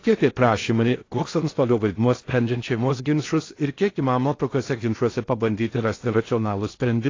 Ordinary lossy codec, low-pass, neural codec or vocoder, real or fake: AAC, 32 kbps; 7.2 kHz; codec, 16 kHz in and 24 kHz out, 0.6 kbps, FocalCodec, streaming, 4096 codes; fake